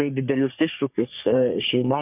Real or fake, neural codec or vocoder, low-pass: fake; codec, 44.1 kHz, 3.4 kbps, Pupu-Codec; 3.6 kHz